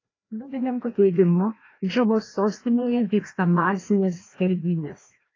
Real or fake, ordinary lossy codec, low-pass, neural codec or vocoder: fake; AAC, 32 kbps; 7.2 kHz; codec, 16 kHz, 1 kbps, FreqCodec, larger model